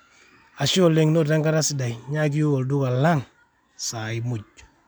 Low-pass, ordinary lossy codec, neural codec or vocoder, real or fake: none; none; none; real